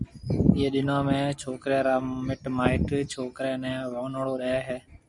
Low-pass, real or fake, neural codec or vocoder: 10.8 kHz; real; none